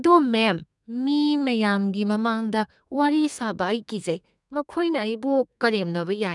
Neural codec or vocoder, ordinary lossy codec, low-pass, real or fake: codec, 32 kHz, 1.9 kbps, SNAC; none; 10.8 kHz; fake